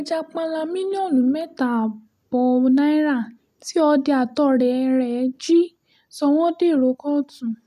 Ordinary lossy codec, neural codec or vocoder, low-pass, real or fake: none; none; 14.4 kHz; real